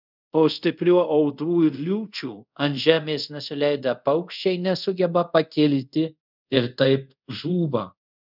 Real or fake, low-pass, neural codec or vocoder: fake; 5.4 kHz; codec, 24 kHz, 0.5 kbps, DualCodec